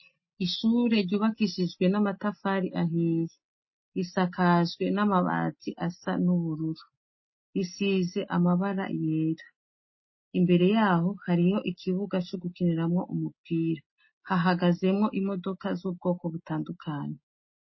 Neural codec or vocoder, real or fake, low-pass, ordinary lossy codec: none; real; 7.2 kHz; MP3, 24 kbps